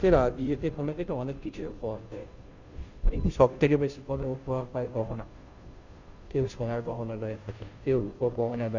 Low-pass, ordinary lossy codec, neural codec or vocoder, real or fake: 7.2 kHz; Opus, 64 kbps; codec, 16 kHz, 0.5 kbps, FunCodec, trained on Chinese and English, 25 frames a second; fake